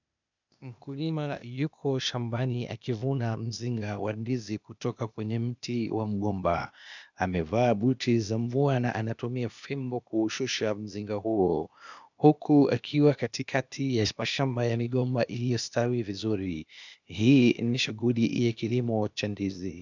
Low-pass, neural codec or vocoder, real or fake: 7.2 kHz; codec, 16 kHz, 0.8 kbps, ZipCodec; fake